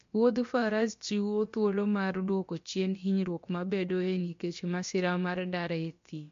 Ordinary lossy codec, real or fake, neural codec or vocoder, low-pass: MP3, 48 kbps; fake; codec, 16 kHz, about 1 kbps, DyCAST, with the encoder's durations; 7.2 kHz